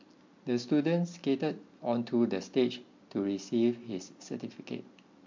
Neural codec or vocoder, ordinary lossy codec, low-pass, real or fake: none; MP3, 48 kbps; 7.2 kHz; real